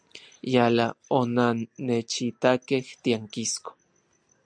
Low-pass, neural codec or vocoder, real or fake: 9.9 kHz; none; real